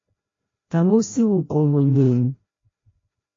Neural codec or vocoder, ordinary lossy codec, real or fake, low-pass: codec, 16 kHz, 0.5 kbps, FreqCodec, larger model; MP3, 32 kbps; fake; 7.2 kHz